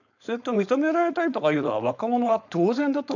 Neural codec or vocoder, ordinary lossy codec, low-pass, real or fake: codec, 16 kHz, 4.8 kbps, FACodec; none; 7.2 kHz; fake